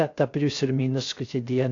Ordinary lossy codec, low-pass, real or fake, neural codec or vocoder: AAC, 48 kbps; 7.2 kHz; fake; codec, 16 kHz, 0.3 kbps, FocalCodec